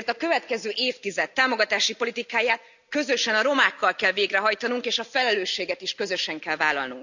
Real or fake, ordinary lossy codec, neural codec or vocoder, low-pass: real; none; none; 7.2 kHz